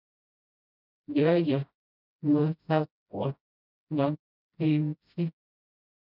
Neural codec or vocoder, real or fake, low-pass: codec, 16 kHz, 0.5 kbps, FreqCodec, smaller model; fake; 5.4 kHz